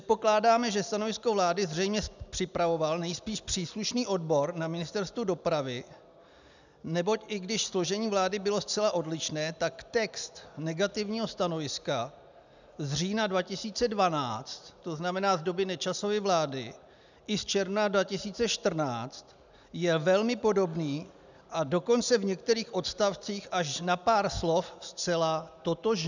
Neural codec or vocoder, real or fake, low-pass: none; real; 7.2 kHz